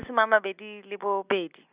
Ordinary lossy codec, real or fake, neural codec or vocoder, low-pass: Opus, 64 kbps; real; none; 3.6 kHz